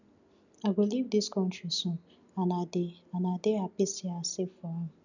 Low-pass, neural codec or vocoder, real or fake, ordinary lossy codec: 7.2 kHz; none; real; none